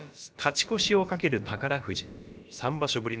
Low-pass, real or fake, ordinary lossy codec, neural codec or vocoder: none; fake; none; codec, 16 kHz, about 1 kbps, DyCAST, with the encoder's durations